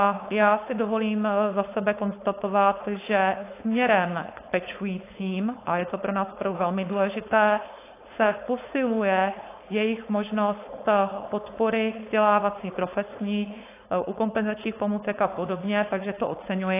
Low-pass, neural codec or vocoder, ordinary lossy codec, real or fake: 3.6 kHz; codec, 16 kHz, 4.8 kbps, FACodec; AAC, 24 kbps; fake